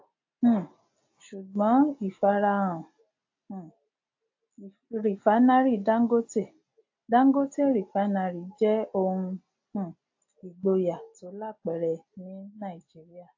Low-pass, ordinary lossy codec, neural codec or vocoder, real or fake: 7.2 kHz; AAC, 48 kbps; none; real